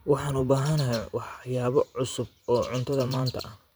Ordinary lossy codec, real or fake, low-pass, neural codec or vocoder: none; fake; none; vocoder, 44.1 kHz, 128 mel bands every 512 samples, BigVGAN v2